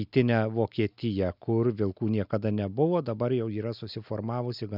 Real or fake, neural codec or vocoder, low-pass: real; none; 5.4 kHz